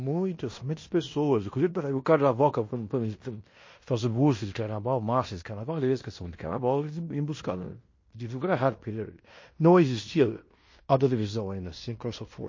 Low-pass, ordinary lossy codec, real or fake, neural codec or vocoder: 7.2 kHz; MP3, 32 kbps; fake; codec, 16 kHz in and 24 kHz out, 0.9 kbps, LongCat-Audio-Codec, fine tuned four codebook decoder